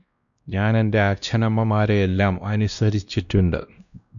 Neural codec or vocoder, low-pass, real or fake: codec, 16 kHz, 1 kbps, X-Codec, WavLM features, trained on Multilingual LibriSpeech; 7.2 kHz; fake